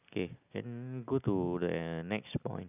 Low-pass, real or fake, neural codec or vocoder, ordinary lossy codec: 3.6 kHz; real; none; none